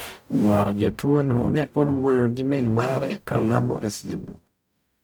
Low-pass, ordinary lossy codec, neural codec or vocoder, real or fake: none; none; codec, 44.1 kHz, 0.9 kbps, DAC; fake